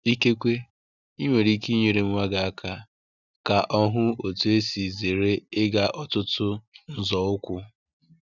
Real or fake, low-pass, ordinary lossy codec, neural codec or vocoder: real; 7.2 kHz; none; none